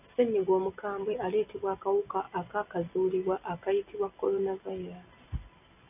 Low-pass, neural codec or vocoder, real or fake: 3.6 kHz; none; real